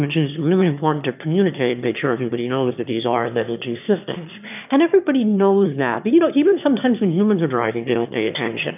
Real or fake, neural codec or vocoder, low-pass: fake; autoencoder, 22.05 kHz, a latent of 192 numbers a frame, VITS, trained on one speaker; 3.6 kHz